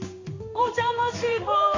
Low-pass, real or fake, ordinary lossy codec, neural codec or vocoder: 7.2 kHz; fake; AAC, 48 kbps; codec, 16 kHz in and 24 kHz out, 1 kbps, XY-Tokenizer